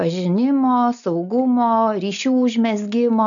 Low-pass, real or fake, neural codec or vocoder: 7.2 kHz; real; none